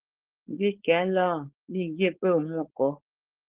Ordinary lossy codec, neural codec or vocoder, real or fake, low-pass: Opus, 16 kbps; codec, 16 kHz, 4.8 kbps, FACodec; fake; 3.6 kHz